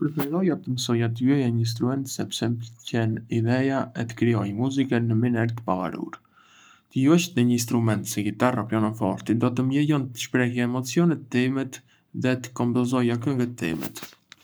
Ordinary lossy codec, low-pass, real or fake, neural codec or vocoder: none; none; fake; codec, 44.1 kHz, 7.8 kbps, Pupu-Codec